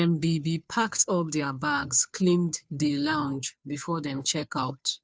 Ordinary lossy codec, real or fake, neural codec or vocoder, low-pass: none; fake; codec, 16 kHz, 2 kbps, FunCodec, trained on Chinese and English, 25 frames a second; none